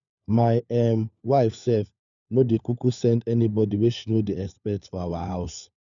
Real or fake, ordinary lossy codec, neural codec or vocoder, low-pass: fake; none; codec, 16 kHz, 4 kbps, FunCodec, trained on LibriTTS, 50 frames a second; 7.2 kHz